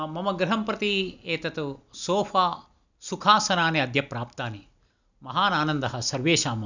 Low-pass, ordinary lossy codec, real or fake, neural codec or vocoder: 7.2 kHz; none; real; none